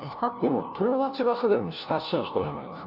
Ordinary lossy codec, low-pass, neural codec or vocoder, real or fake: none; 5.4 kHz; codec, 16 kHz, 1 kbps, FunCodec, trained on LibriTTS, 50 frames a second; fake